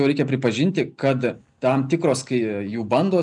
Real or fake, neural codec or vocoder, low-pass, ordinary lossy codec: real; none; 10.8 kHz; MP3, 96 kbps